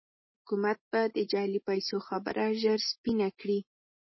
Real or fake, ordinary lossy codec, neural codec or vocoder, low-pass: real; MP3, 24 kbps; none; 7.2 kHz